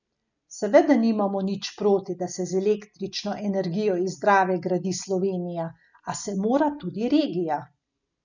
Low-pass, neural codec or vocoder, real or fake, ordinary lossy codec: 7.2 kHz; none; real; none